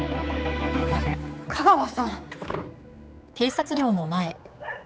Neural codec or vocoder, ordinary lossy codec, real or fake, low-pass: codec, 16 kHz, 4 kbps, X-Codec, HuBERT features, trained on general audio; none; fake; none